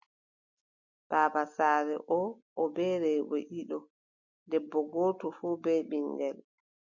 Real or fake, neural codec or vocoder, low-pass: real; none; 7.2 kHz